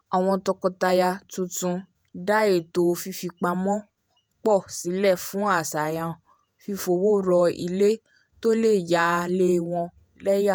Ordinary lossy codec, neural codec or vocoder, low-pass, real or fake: none; vocoder, 48 kHz, 128 mel bands, Vocos; none; fake